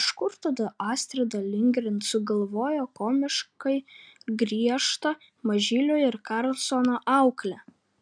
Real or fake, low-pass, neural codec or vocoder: real; 9.9 kHz; none